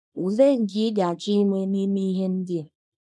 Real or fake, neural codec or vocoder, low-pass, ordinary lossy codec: fake; codec, 24 kHz, 0.9 kbps, WavTokenizer, small release; none; none